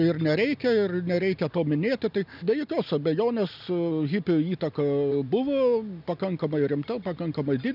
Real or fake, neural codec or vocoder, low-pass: real; none; 5.4 kHz